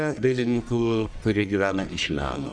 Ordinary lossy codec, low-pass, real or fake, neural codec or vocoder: MP3, 96 kbps; 9.9 kHz; fake; codec, 44.1 kHz, 1.7 kbps, Pupu-Codec